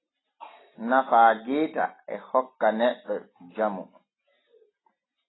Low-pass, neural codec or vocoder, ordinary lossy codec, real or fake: 7.2 kHz; none; AAC, 16 kbps; real